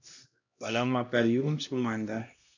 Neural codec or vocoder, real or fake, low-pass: codec, 16 kHz, 1 kbps, X-Codec, HuBERT features, trained on LibriSpeech; fake; 7.2 kHz